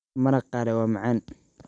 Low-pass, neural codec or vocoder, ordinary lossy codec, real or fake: 9.9 kHz; vocoder, 44.1 kHz, 128 mel bands every 256 samples, BigVGAN v2; none; fake